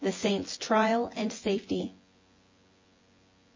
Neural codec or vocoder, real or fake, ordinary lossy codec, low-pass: vocoder, 24 kHz, 100 mel bands, Vocos; fake; MP3, 32 kbps; 7.2 kHz